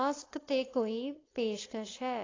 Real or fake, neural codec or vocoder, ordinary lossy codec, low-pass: fake; codec, 16 kHz, 4.8 kbps, FACodec; AAC, 32 kbps; 7.2 kHz